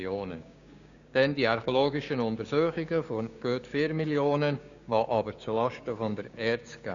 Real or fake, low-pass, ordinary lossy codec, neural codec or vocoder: fake; 7.2 kHz; none; codec, 16 kHz, 6 kbps, DAC